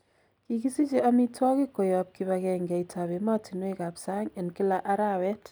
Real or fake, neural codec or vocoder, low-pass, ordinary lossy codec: real; none; none; none